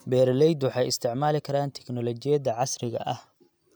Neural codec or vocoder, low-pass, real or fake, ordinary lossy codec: none; none; real; none